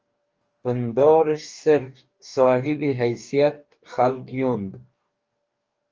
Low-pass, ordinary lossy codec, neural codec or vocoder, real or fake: 7.2 kHz; Opus, 24 kbps; codec, 44.1 kHz, 2.6 kbps, DAC; fake